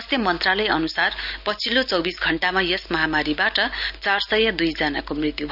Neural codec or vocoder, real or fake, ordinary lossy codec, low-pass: none; real; none; 5.4 kHz